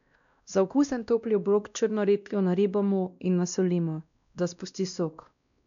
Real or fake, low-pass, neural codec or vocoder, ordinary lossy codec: fake; 7.2 kHz; codec, 16 kHz, 1 kbps, X-Codec, WavLM features, trained on Multilingual LibriSpeech; none